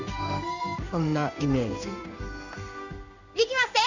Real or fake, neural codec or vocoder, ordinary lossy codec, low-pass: fake; codec, 16 kHz in and 24 kHz out, 1 kbps, XY-Tokenizer; none; 7.2 kHz